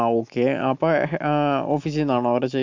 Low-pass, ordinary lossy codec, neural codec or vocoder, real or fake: 7.2 kHz; MP3, 64 kbps; none; real